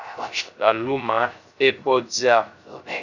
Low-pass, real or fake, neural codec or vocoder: 7.2 kHz; fake; codec, 16 kHz, 0.3 kbps, FocalCodec